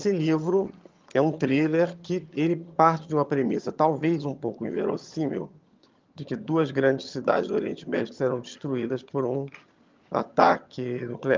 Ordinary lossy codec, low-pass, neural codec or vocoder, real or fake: Opus, 24 kbps; 7.2 kHz; vocoder, 22.05 kHz, 80 mel bands, HiFi-GAN; fake